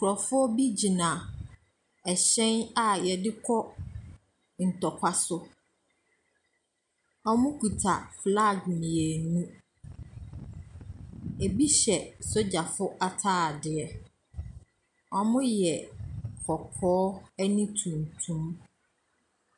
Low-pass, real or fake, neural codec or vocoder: 10.8 kHz; real; none